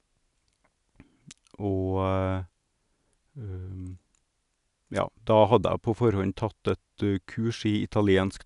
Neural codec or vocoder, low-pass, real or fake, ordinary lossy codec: none; 10.8 kHz; real; none